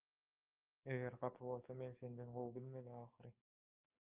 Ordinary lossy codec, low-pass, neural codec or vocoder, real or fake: Opus, 32 kbps; 3.6 kHz; codec, 16 kHz, 6 kbps, DAC; fake